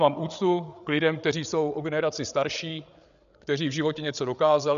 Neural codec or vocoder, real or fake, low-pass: codec, 16 kHz, 8 kbps, FreqCodec, larger model; fake; 7.2 kHz